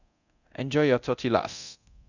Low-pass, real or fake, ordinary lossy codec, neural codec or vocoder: 7.2 kHz; fake; none; codec, 24 kHz, 0.9 kbps, DualCodec